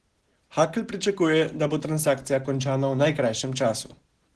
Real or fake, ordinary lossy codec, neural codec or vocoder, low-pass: real; Opus, 16 kbps; none; 10.8 kHz